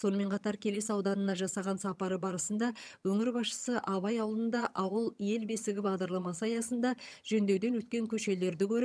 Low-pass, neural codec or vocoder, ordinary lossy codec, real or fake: none; vocoder, 22.05 kHz, 80 mel bands, HiFi-GAN; none; fake